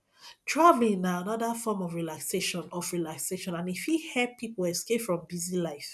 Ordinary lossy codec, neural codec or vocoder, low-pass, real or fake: none; none; none; real